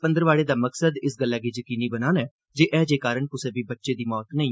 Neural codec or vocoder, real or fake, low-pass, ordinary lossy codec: none; real; none; none